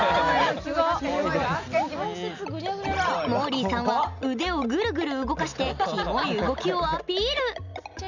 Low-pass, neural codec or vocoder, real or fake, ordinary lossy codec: 7.2 kHz; none; real; none